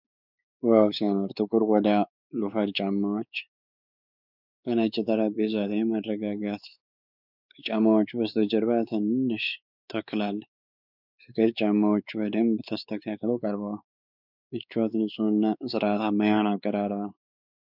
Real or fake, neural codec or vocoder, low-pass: fake; codec, 16 kHz, 4 kbps, X-Codec, WavLM features, trained on Multilingual LibriSpeech; 5.4 kHz